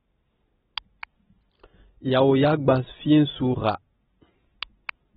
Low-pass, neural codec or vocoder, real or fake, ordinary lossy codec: 10.8 kHz; none; real; AAC, 16 kbps